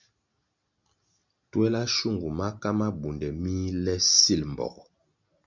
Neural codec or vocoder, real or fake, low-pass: none; real; 7.2 kHz